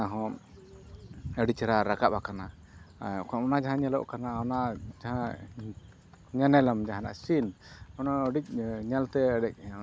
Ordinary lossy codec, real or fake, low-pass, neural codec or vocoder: none; real; none; none